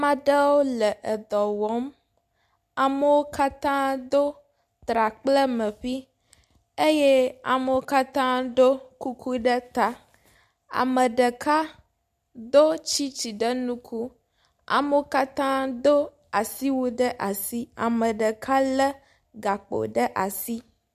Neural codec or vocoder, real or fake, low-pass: none; real; 14.4 kHz